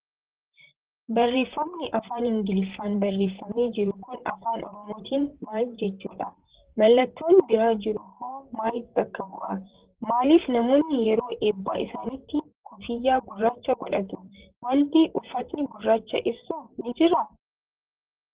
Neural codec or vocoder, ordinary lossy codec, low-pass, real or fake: vocoder, 44.1 kHz, 128 mel bands, Pupu-Vocoder; Opus, 16 kbps; 3.6 kHz; fake